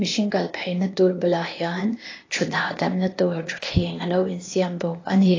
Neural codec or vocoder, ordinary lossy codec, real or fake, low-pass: codec, 16 kHz, 0.8 kbps, ZipCodec; AAC, 32 kbps; fake; 7.2 kHz